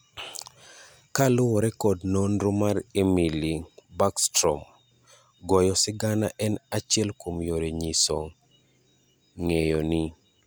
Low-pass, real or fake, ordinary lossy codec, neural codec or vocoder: none; real; none; none